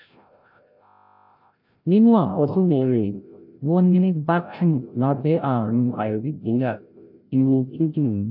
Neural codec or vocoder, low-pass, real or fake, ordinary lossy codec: codec, 16 kHz, 0.5 kbps, FreqCodec, larger model; 5.4 kHz; fake; none